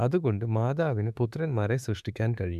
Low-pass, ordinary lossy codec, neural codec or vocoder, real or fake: 14.4 kHz; none; autoencoder, 48 kHz, 32 numbers a frame, DAC-VAE, trained on Japanese speech; fake